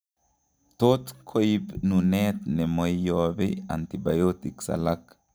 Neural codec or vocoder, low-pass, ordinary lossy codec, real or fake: none; none; none; real